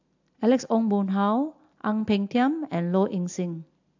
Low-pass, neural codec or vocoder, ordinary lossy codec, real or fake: 7.2 kHz; none; AAC, 48 kbps; real